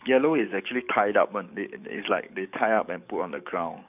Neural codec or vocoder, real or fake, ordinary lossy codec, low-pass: codec, 44.1 kHz, 7.8 kbps, DAC; fake; none; 3.6 kHz